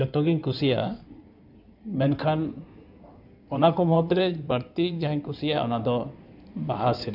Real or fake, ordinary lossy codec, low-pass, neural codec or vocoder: fake; none; 5.4 kHz; codec, 16 kHz in and 24 kHz out, 2.2 kbps, FireRedTTS-2 codec